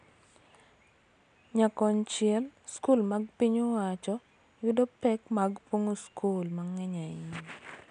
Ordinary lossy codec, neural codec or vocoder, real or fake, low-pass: none; none; real; 9.9 kHz